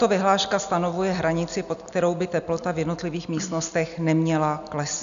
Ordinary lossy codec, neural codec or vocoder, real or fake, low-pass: AAC, 96 kbps; none; real; 7.2 kHz